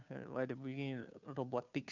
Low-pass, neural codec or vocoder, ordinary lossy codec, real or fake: 7.2 kHz; codec, 16 kHz, 2 kbps, FunCodec, trained on Chinese and English, 25 frames a second; none; fake